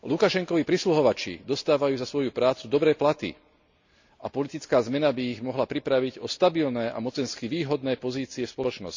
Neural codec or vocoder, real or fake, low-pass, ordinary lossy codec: none; real; 7.2 kHz; MP3, 64 kbps